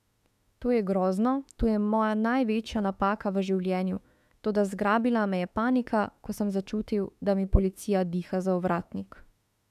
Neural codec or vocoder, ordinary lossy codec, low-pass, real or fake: autoencoder, 48 kHz, 32 numbers a frame, DAC-VAE, trained on Japanese speech; none; 14.4 kHz; fake